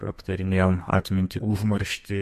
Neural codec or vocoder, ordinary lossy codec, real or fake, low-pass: codec, 32 kHz, 1.9 kbps, SNAC; MP3, 64 kbps; fake; 14.4 kHz